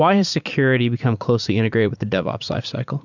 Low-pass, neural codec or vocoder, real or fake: 7.2 kHz; vocoder, 44.1 kHz, 80 mel bands, Vocos; fake